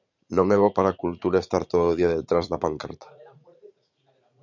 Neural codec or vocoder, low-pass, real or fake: vocoder, 44.1 kHz, 80 mel bands, Vocos; 7.2 kHz; fake